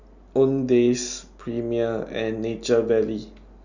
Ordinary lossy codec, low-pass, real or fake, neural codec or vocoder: none; 7.2 kHz; real; none